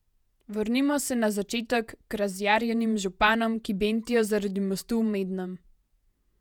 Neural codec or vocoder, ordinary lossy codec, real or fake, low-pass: vocoder, 48 kHz, 128 mel bands, Vocos; none; fake; 19.8 kHz